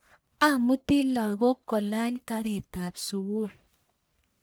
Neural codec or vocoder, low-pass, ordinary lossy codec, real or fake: codec, 44.1 kHz, 1.7 kbps, Pupu-Codec; none; none; fake